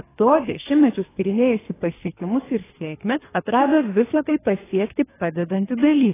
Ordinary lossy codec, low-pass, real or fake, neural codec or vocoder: AAC, 16 kbps; 3.6 kHz; fake; codec, 24 kHz, 3 kbps, HILCodec